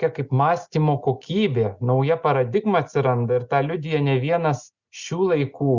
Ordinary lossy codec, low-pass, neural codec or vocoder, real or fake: Opus, 64 kbps; 7.2 kHz; none; real